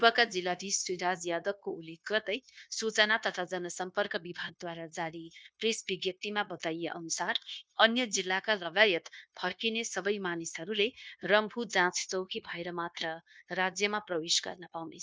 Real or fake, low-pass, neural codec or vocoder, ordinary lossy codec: fake; none; codec, 16 kHz, 0.9 kbps, LongCat-Audio-Codec; none